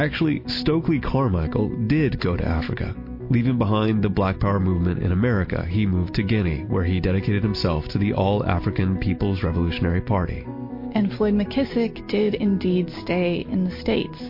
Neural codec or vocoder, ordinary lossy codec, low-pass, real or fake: none; MP3, 32 kbps; 5.4 kHz; real